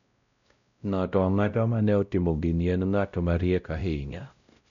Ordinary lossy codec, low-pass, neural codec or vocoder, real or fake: none; 7.2 kHz; codec, 16 kHz, 0.5 kbps, X-Codec, WavLM features, trained on Multilingual LibriSpeech; fake